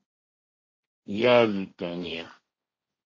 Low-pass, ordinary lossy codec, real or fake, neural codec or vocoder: 7.2 kHz; MP3, 32 kbps; fake; codec, 16 kHz, 1.1 kbps, Voila-Tokenizer